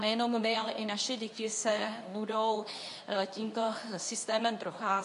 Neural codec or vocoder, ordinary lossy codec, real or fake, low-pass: codec, 24 kHz, 0.9 kbps, WavTokenizer, medium speech release version 2; AAC, 64 kbps; fake; 10.8 kHz